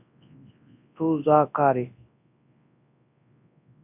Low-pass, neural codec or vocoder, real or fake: 3.6 kHz; codec, 24 kHz, 0.9 kbps, WavTokenizer, large speech release; fake